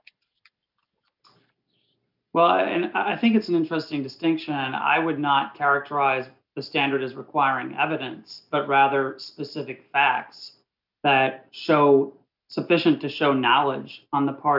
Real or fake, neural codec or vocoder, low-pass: real; none; 5.4 kHz